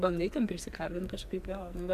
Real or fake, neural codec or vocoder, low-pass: fake; codec, 44.1 kHz, 2.6 kbps, SNAC; 14.4 kHz